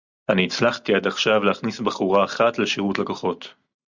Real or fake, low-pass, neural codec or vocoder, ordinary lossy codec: real; 7.2 kHz; none; Opus, 64 kbps